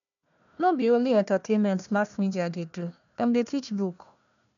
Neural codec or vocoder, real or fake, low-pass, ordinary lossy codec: codec, 16 kHz, 1 kbps, FunCodec, trained on Chinese and English, 50 frames a second; fake; 7.2 kHz; none